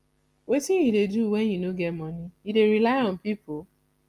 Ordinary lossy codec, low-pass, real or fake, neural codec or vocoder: Opus, 32 kbps; 14.4 kHz; real; none